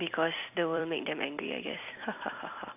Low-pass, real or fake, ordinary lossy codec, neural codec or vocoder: 3.6 kHz; fake; none; vocoder, 44.1 kHz, 128 mel bands every 256 samples, BigVGAN v2